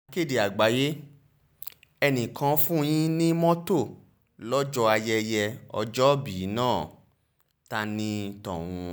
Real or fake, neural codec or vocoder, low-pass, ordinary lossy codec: real; none; none; none